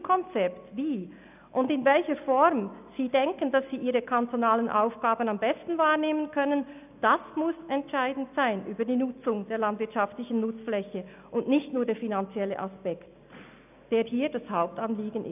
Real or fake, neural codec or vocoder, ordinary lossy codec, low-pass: real; none; none; 3.6 kHz